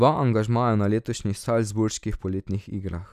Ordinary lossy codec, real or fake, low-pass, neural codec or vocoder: none; real; 14.4 kHz; none